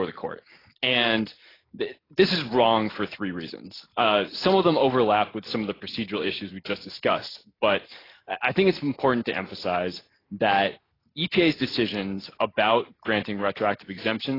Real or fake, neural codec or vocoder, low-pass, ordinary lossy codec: real; none; 5.4 kHz; AAC, 24 kbps